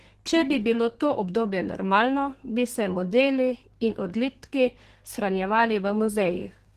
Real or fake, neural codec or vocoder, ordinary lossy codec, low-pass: fake; codec, 32 kHz, 1.9 kbps, SNAC; Opus, 16 kbps; 14.4 kHz